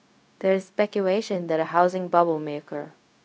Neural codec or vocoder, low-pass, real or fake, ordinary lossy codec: codec, 16 kHz, 0.4 kbps, LongCat-Audio-Codec; none; fake; none